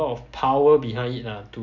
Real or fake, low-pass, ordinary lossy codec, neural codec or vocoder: real; 7.2 kHz; none; none